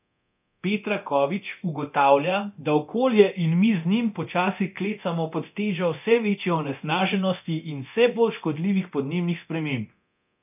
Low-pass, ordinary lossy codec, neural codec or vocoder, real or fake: 3.6 kHz; none; codec, 24 kHz, 0.9 kbps, DualCodec; fake